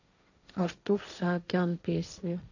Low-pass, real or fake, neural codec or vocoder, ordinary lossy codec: 7.2 kHz; fake; codec, 16 kHz, 1.1 kbps, Voila-Tokenizer; none